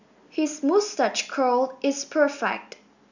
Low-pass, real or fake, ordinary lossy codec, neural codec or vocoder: 7.2 kHz; real; none; none